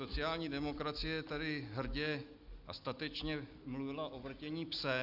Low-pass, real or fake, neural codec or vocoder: 5.4 kHz; real; none